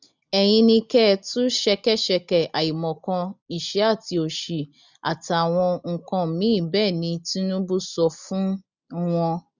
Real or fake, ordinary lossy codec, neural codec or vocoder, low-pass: real; none; none; 7.2 kHz